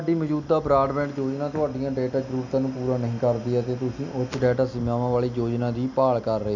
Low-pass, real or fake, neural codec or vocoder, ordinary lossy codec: 7.2 kHz; real; none; none